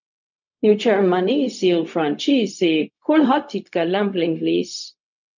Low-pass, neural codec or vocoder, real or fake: 7.2 kHz; codec, 16 kHz, 0.4 kbps, LongCat-Audio-Codec; fake